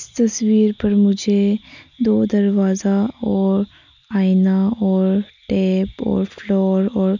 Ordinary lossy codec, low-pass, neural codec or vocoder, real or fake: none; 7.2 kHz; none; real